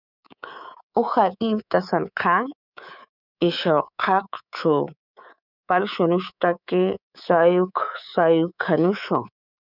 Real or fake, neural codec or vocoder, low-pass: fake; codec, 24 kHz, 3.1 kbps, DualCodec; 5.4 kHz